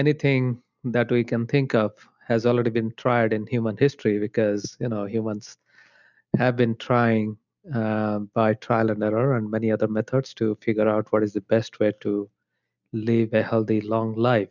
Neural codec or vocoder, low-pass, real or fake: none; 7.2 kHz; real